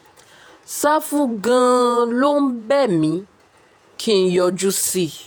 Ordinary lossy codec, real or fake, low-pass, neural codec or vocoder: none; fake; none; vocoder, 48 kHz, 128 mel bands, Vocos